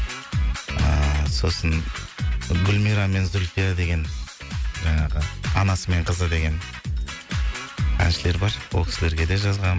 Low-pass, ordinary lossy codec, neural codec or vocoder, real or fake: none; none; none; real